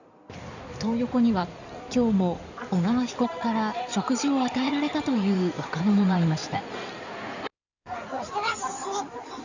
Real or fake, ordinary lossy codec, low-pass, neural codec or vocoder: fake; none; 7.2 kHz; codec, 16 kHz in and 24 kHz out, 2.2 kbps, FireRedTTS-2 codec